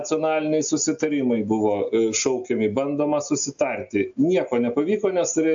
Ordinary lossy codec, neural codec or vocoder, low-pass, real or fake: AAC, 64 kbps; none; 7.2 kHz; real